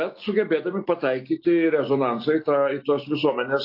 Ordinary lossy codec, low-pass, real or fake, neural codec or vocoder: AAC, 32 kbps; 5.4 kHz; real; none